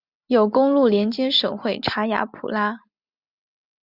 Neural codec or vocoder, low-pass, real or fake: none; 5.4 kHz; real